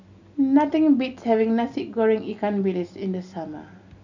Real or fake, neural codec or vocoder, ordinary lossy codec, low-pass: real; none; none; 7.2 kHz